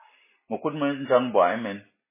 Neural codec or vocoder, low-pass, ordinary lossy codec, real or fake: none; 3.6 kHz; MP3, 16 kbps; real